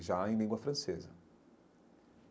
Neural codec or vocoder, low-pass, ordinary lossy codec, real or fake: none; none; none; real